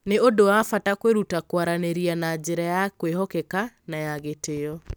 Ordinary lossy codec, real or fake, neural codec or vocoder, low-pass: none; real; none; none